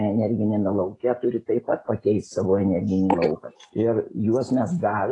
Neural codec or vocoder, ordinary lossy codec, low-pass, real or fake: none; AAC, 32 kbps; 10.8 kHz; real